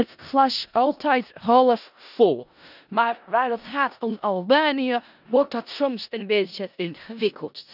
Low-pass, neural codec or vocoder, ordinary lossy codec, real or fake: 5.4 kHz; codec, 16 kHz in and 24 kHz out, 0.4 kbps, LongCat-Audio-Codec, four codebook decoder; none; fake